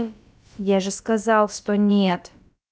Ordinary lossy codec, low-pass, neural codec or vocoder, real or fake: none; none; codec, 16 kHz, about 1 kbps, DyCAST, with the encoder's durations; fake